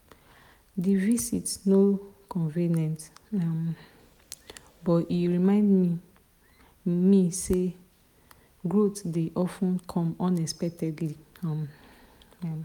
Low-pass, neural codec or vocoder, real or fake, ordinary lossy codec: 19.8 kHz; none; real; none